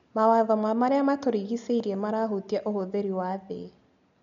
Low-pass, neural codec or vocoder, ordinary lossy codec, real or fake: 7.2 kHz; none; MP3, 64 kbps; real